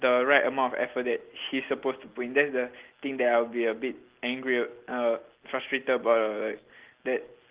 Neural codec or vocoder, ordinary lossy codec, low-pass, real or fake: none; Opus, 16 kbps; 3.6 kHz; real